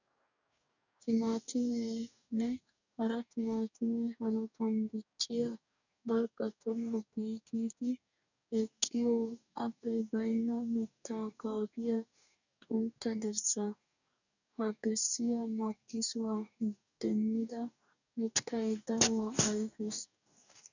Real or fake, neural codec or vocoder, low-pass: fake; codec, 44.1 kHz, 2.6 kbps, DAC; 7.2 kHz